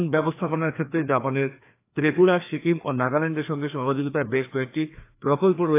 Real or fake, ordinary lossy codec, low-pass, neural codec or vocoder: fake; AAC, 24 kbps; 3.6 kHz; codec, 16 kHz, 2 kbps, FreqCodec, larger model